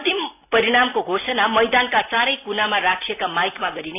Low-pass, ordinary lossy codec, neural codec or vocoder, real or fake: 3.6 kHz; AAC, 24 kbps; none; real